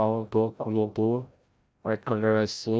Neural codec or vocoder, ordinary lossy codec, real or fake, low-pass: codec, 16 kHz, 0.5 kbps, FreqCodec, larger model; none; fake; none